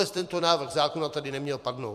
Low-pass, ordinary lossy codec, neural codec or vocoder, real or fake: 14.4 kHz; MP3, 96 kbps; vocoder, 44.1 kHz, 128 mel bands every 256 samples, BigVGAN v2; fake